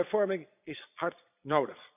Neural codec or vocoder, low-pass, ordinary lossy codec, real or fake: vocoder, 22.05 kHz, 80 mel bands, Vocos; 3.6 kHz; none; fake